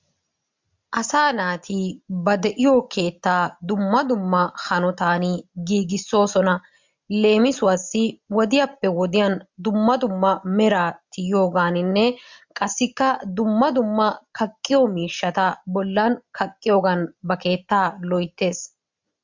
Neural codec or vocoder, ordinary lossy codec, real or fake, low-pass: none; MP3, 64 kbps; real; 7.2 kHz